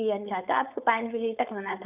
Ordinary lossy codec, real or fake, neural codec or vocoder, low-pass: none; fake; codec, 16 kHz, 4.8 kbps, FACodec; 3.6 kHz